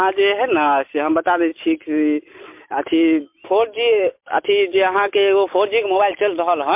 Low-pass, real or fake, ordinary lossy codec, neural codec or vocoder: 3.6 kHz; real; MP3, 32 kbps; none